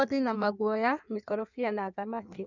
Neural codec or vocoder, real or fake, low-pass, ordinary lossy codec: codec, 16 kHz in and 24 kHz out, 1.1 kbps, FireRedTTS-2 codec; fake; 7.2 kHz; none